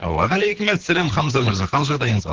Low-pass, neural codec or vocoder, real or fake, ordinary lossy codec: 7.2 kHz; codec, 24 kHz, 3 kbps, HILCodec; fake; Opus, 16 kbps